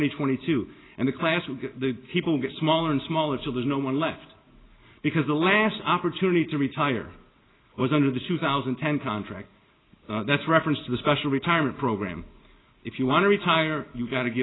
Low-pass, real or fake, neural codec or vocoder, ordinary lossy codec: 7.2 kHz; real; none; AAC, 16 kbps